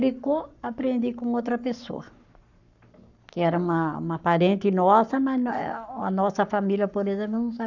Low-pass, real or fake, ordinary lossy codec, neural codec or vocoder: 7.2 kHz; fake; none; codec, 44.1 kHz, 7.8 kbps, Pupu-Codec